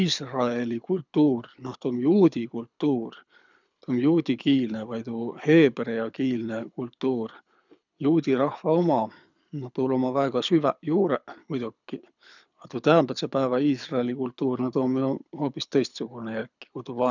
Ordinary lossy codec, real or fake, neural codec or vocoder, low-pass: none; fake; codec, 24 kHz, 6 kbps, HILCodec; 7.2 kHz